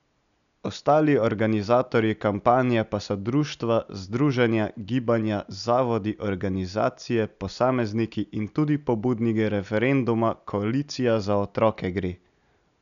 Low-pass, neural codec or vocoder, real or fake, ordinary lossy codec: 7.2 kHz; none; real; none